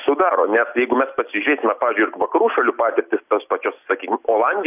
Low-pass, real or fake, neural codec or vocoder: 3.6 kHz; real; none